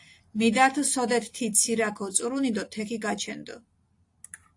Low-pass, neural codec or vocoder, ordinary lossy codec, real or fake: 10.8 kHz; none; AAC, 48 kbps; real